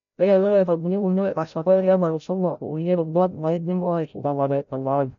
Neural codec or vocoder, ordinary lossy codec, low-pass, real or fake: codec, 16 kHz, 0.5 kbps, FreqCodec, larger model; none; 7.2 kHz; fake